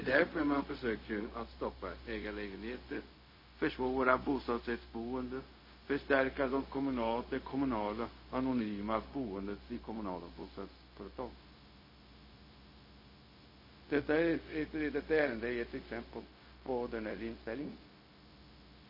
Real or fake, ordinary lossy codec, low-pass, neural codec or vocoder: fake; MP3, 24 kbps; 5.4 kHz; codec, 16 kHz, 0.4 kbps, LongCat-Audio-Codec